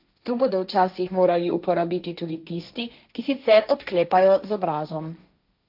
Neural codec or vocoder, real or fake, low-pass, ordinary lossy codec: codec, 16 kHz, 1.1 kbps, Voila-Tokenizer; fake; 5.4 kHz; AAC, 48 kbps